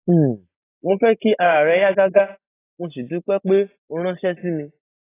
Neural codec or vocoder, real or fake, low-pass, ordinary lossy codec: none; real; 3.6 kHz; AAC, 16 kbps